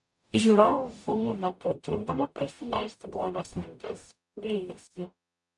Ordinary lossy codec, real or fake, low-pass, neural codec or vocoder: MP3, 96 kbps; fake; 10.8 kHz; codec, 44.1 kHz, 0.9 kbps, DAC